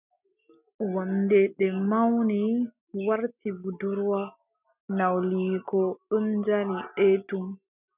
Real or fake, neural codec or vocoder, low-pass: real; none; 3.6 kHz